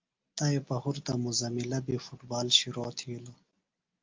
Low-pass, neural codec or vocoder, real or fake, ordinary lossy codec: 7.2 kHz; none; real; Opus, 32 kbps